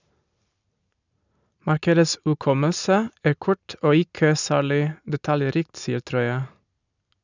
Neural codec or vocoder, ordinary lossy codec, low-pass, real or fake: none; none; 7.2 kHz; real